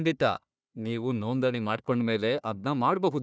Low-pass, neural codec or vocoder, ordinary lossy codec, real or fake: none; codec, 16 kHz, 1 kbps, FunCodec, trained on Chinese and English, 50 frames a second; none; fake